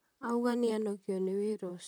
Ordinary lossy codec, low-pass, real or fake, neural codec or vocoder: none; none; fake; vocoder, 44.1 kHz, 128 mel bands, Pupu-Vocoder